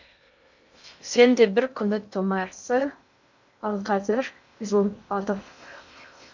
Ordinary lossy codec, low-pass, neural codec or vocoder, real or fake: none; 7.2 kHz; codec, 16 kHz in and 24 kHz out, 0.6 kbps, FocalCodec, streaming, 2048 codes; fake